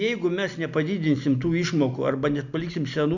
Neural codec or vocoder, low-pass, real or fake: none; 7.2 kHz; real